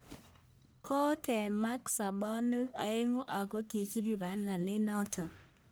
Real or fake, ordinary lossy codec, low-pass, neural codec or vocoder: fake; none; none; codec, 44.1 kHz, 1.7 kbps, Pupu-Codec